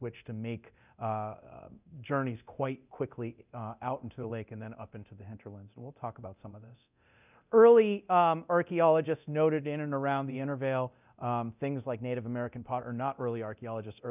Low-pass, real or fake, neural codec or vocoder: 3.6 kHz; fake; codec, 24 kHz, 0.9 kbps, DualCodec